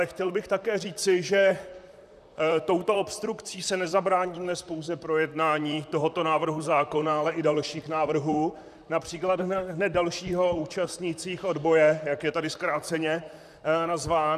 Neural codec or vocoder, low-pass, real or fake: vocoder, 44.1 kHz, 128 mel bands, Pupu-Vocoder; 14.4 kHz; fake